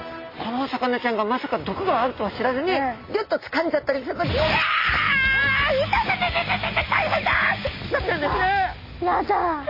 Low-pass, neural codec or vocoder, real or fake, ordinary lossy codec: 5.4 kHz; none; real; MP3, 24 kbps